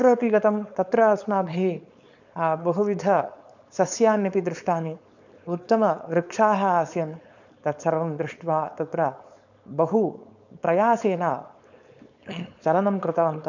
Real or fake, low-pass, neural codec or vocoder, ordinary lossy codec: fake; 7.2 kHz; codec, 16 kHz, 4.8 kbps, FACodec; none